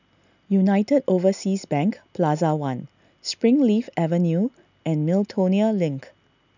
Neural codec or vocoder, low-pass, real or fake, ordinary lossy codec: none; 7.2 kHz; real; none